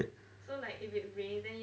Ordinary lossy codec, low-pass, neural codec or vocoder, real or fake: none; none; none; real